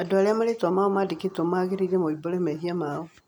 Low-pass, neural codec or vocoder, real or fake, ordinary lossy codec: 19.8 kHz; none; real; none